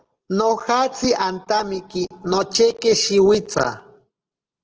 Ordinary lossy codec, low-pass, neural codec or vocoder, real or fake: Opus, 16 kbps; 7.2 kHz; none; real